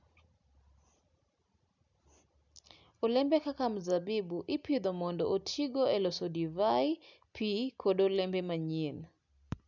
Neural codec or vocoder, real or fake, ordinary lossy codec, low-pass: none; real; none; 7.2 kHz